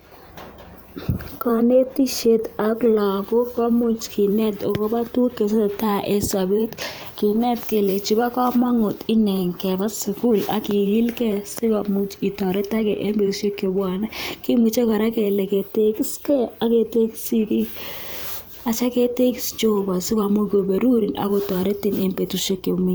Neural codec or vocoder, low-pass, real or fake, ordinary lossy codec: vocoder, 44.1 kHz, 128 mel bands every 512 samples, BigVGAN v2; none; fake; none